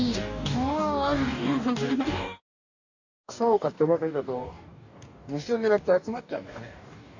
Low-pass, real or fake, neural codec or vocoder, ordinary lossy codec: 7.2 kHz; fake; codec, 44.1 kHz, 2.6 kbps, DAC; none